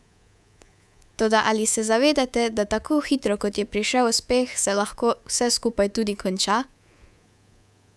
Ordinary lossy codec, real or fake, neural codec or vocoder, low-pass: none; fake; codec, 24 kHz, 3.1 kbps, DualCodec; none